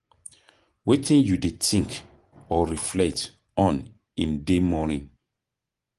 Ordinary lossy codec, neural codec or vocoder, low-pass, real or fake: Opus, 24 kbps; vocoder, 24 kHz, 100 mel bands, Vocos; 10.8 kHz; fake